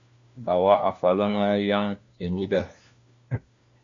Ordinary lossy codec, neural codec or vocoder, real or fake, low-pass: Opus, 64 kbps; codec, 16 kHz, 1 kbps, FunCodec, trained on LibriTTS, 50 frames a second; fake; 7.2 kHz